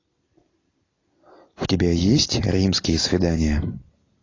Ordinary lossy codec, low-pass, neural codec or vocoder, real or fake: AAC, 32 kbps; 7.2 kHz; none; real